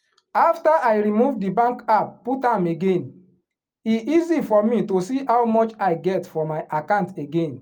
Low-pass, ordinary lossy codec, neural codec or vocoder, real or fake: 19.8 kHz; Opus, 32 kbps; none; real